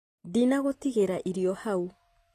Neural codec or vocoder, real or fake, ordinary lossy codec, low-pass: none; real; AAC, 48 kbps; 14.4 kHz